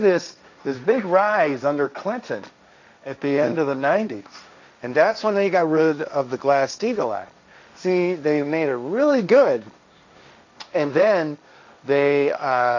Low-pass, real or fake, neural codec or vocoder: 7.2 kHz; fake; codec, 16 kHz, 1.1 kbps, Voila-Tokenizer